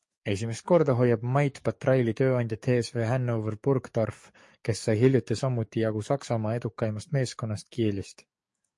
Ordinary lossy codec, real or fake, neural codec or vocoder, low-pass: MP3, 48 kbps; fake; codec, 44.1 kHz, 7.8 kbps, DAC; 10.8 kHz